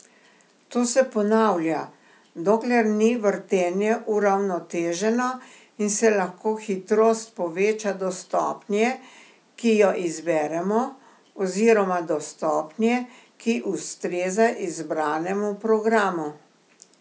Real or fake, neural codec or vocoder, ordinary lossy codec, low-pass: real; none; none; none